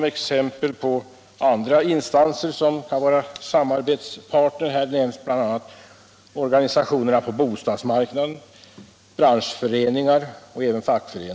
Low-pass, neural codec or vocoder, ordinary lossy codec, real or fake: none; none; none; real